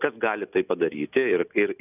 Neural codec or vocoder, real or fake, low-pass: none; real; 3.6 kHz